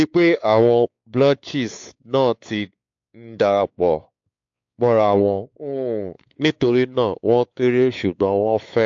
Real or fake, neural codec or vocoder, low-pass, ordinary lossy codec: fake; codec, 16 kHz, 4 kbps, X-Codec, WavLM features, trained on Multilingual LibriSpeech; 7.2 kHz; AAC, 64 kbps